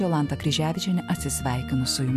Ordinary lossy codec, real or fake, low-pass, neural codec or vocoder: AAC, 96 kbps; real; 14.4 kHz; none